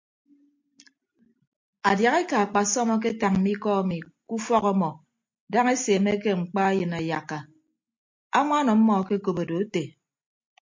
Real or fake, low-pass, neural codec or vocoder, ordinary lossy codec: real; 7.2 kHz; none; MP3, 48 kbps